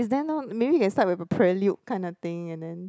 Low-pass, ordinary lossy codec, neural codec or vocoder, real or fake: none; none; none; real